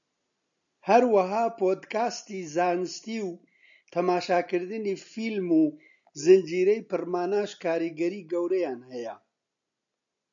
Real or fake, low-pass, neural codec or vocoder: real; 7.2 kHz; none